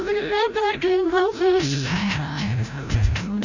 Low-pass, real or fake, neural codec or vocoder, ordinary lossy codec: 7.2 kHz; fake; codec, 16 kHz, 0.5 kbps, FreqCodec, larger model; none